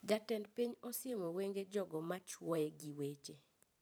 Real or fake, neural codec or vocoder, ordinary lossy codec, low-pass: fake; vocoder, 44.1 kHz, 128 mel bands every 512 samples, BigVGAN v2; none; none